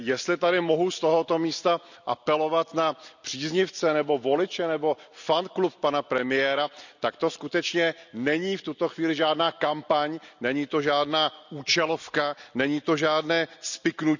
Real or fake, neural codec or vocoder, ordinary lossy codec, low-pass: real; none; none; 7.2 kHz